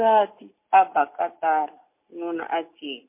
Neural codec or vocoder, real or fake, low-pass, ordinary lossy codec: none; real; 3.6 kHz; MP3, 24 kbps